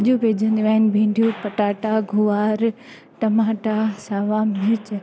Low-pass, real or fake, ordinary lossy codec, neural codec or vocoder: none; real; none; none